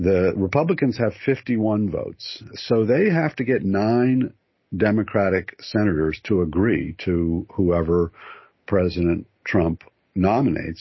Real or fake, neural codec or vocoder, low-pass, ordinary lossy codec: real; none; 7.2 kHz; MP3, 24 kbps